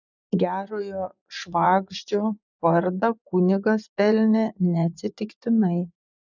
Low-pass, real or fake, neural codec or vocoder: 7.2 kHz; fake; vocoder, 24 kHz, 100 mel bands, Vocos